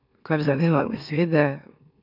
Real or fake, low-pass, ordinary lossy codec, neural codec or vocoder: fake; 5.4 kHz; none; autoencoder, 44.1 kHz, a latent of 192 numbers a frame, MeloTTS